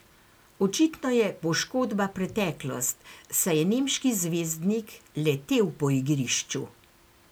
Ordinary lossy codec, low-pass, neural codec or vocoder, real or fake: none; none; none; real